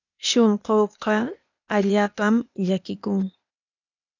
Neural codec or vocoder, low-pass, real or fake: codec, 16 kHz, 0.8 kbps, ZipCodec; 7.2 kHz; fake